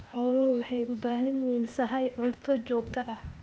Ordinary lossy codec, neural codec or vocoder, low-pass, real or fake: none; codec, 16 kHz, 0.8 kbps, ZipCodec; none; fake